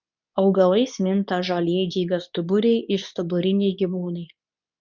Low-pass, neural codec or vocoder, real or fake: 7.2 kHz; codec, 24 kHz, 0.9 kbps, WavTokenizer, medium speech release version 2; fake